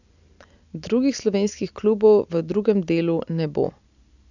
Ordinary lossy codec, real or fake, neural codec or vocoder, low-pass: none; real; none; 7.2 kHz